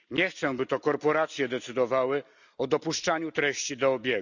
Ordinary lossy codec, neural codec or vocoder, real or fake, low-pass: none; none; real; 7.2 kHz